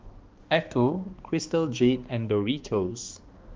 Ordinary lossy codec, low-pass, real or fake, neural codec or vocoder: Opus, 32 kbps; 7.2 kHz; fake; codec, 16 kHz, 2 kbps, X-Codec, HuBERT features, trained on balanced general audio